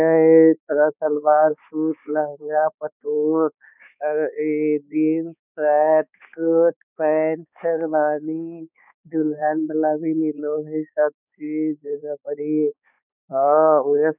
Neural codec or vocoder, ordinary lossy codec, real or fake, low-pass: codec, 16 kHz, 4 kbps, X-Codec, HuBERT features, trained on balanced general audio; none; fake; 3.6 kHz